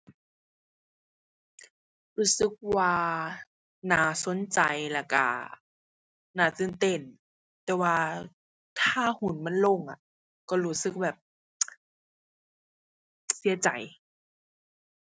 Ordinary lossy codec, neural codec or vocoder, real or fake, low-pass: none; none; real; none